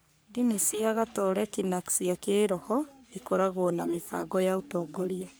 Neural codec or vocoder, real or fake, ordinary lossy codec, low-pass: codec, 44.1 kHz, 3.4 kbps, Pupu-Codec; fake; none; none